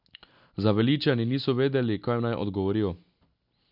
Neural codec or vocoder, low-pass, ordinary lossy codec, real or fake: none; 5.4 kHz; none; real